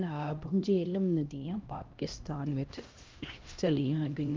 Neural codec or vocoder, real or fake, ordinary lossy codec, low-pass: codec, 16 kHz, 1 kbps, X-Codec, HuBERT features, trained on LibriSpeech; fake; Opus, 24 kbps; 7.2 kHz